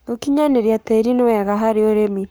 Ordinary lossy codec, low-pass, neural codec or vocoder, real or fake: none; none; codec, 44.1 kHz, 7.8 kbps, Pupu-Codec; fake